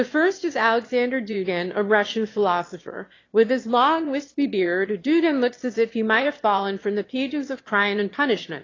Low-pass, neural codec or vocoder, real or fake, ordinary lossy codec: 7.2 kHz; autoencoder, 22.05 kHz, a latent of 192 numbers a frame, VITS, trained on one speaker; fake; AAC, 32 kbps